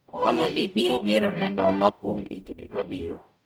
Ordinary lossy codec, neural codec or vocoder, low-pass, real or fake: none; codec, 44.1 kHz, 0.9 kbps, DAC; none; fake